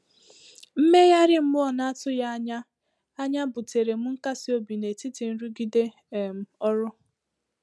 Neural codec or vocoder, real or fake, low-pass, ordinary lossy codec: none; real; none; none